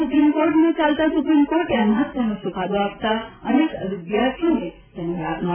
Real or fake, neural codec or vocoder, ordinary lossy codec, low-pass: real; none; none; 3.6 kHz